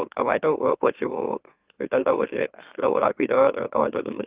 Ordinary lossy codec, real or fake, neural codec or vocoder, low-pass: Opus, 24 kbps; fake; autoencoder, 44.1 kHz, a latent of 192 numbers a frame, MeloTTS; 3.6 kHz